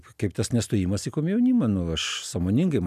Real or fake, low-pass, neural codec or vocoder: real; 14.4 kHz; none